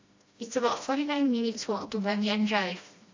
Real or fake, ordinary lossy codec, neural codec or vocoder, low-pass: fake; AAC, 48 kbps; codec, 16 kHz, 1 kbps, FreqCodec, smaller model; 7.2 kHz